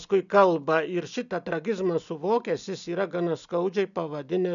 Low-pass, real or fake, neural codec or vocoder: 7.2 kHz; real; none